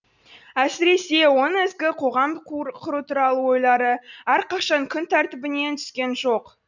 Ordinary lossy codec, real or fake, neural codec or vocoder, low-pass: none; real; none; 7.2 kHz